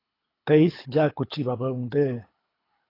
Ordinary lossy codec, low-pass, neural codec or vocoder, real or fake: AAC, 24 kbps; 5.4 kHz; codec, 24 kHz, 6 kbps, HILCodec; fake